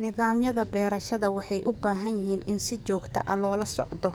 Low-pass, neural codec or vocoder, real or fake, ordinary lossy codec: none; codec, 44.1 kHz, 2.6 kbps, SNAC; fake; none